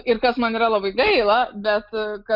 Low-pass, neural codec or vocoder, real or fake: 5.4 kHz; none; real